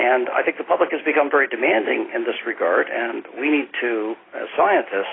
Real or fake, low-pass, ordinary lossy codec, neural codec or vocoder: real; 7.2 kHz; AAC, 16 kbps; none